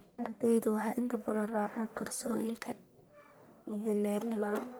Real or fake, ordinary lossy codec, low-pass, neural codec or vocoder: fake; none; none; codec, 44.1 kHz, 1.7 kbps, Pupu-Codec